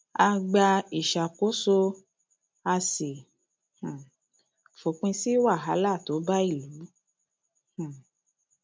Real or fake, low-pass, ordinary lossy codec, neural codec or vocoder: real; none; none; none